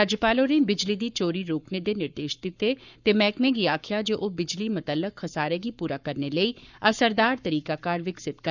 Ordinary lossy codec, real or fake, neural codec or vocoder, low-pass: none; fake; codec, 44.1 kHz, 7.8 kbps, Pupu-Codec; 7.2 kHz